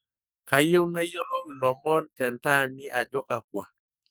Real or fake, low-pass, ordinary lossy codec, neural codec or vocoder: fake; none; none; codec, 44.1 kHz, 2.6 kbps, SNAC